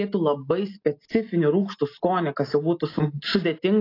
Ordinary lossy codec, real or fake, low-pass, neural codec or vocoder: AAC, 32 kbps; real; 5.4 kHz; none